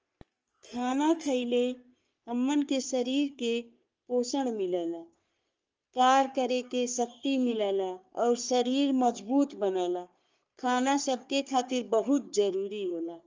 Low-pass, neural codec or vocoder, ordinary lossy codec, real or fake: 7.2 kHz; codec, 44.1 kHz, 3.4 kbps, Pupu-Codec; Opus, 24 kbps; fake